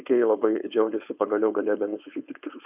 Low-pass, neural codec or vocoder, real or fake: 3.6 kHz; codec, 16 kHz, 4.8 kbps, FACodec; fake